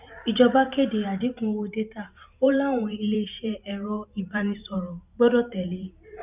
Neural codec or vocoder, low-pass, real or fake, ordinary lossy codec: vocoder, 44.1 kHz, 128 mel bands every 512 samples, BigVGAN v2; 3.6 kHz; fake; none